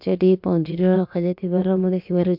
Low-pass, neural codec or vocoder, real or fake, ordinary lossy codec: 5.4 kHz; codec, 16 kHz, about 1 kbps, DyCAST, with the encoder's durations; fake; MP3, 48 kbps